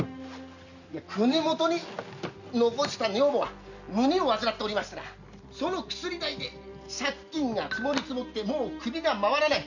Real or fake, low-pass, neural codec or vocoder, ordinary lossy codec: real; 7.2 kHz; none; none